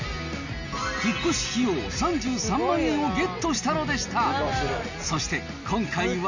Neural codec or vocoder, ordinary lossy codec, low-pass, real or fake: none; none; 7.2 kHz; real